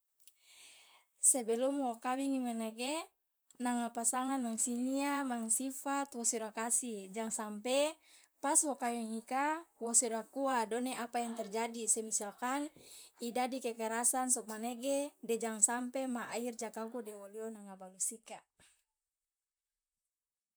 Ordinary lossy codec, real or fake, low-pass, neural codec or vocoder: none; fake; none; vocoder, 44.1 kHz, 128 mel bands, Pupu-Vocoder